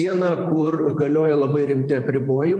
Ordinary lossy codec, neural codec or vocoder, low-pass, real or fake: MP3, 48 kbps; vocoder, 44.1 kHz, 128 mel bands, Pupu-Vocoder; 10.8 kHz; fake